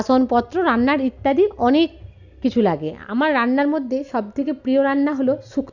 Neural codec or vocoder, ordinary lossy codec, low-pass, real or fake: none; none; 7.2 kHz; real